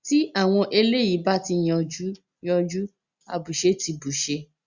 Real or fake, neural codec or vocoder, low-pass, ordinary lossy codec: real; none; 7.2 kHz; Opus, 64 kbps